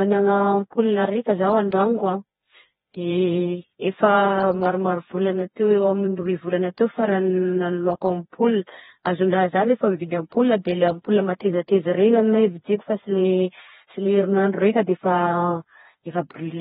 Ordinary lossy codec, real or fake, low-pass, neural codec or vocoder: AAC, 16 kbps; fake; 7.2 kHz; codec, 16 kHz, 2 kbps, FreqCodec, smaller model